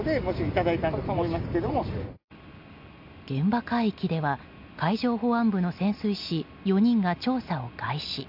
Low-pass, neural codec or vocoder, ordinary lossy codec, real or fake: 5.4 kHz; none; AAC, 48 kbps; real